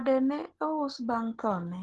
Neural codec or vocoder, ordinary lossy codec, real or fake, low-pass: none; Opus, 16 kbps; real; 10.8 kHz